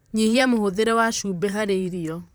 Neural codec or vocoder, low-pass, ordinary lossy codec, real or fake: vocoder, 44.1 kHz, 128 mel bands, Pupu-Vocoder; none; none; fake